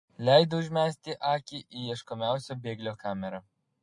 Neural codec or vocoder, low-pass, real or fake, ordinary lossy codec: none; 10.8 kHz; real; MP3, 48 kbps